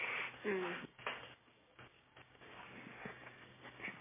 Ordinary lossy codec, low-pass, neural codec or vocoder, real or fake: MP3, 16 kbps; 3.6 kHz; codec, 16 kHz, 6 kbps, DAC; fake